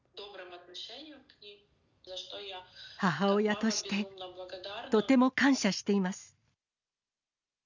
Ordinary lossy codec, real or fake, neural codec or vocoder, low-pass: none; real; none; 7.2 kHz